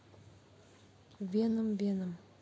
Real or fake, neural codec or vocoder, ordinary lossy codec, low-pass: real; none; none; none